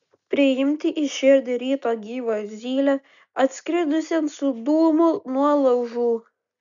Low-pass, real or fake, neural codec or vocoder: 7.2 kHz; real; none